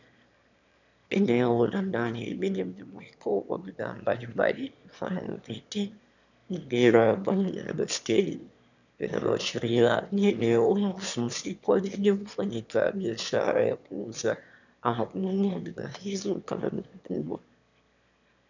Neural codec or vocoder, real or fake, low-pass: autoencoder, 22.05 kHz, a latent of 192 numbers a frame, VITS, trained on one speaker; fake; 7.2 kHz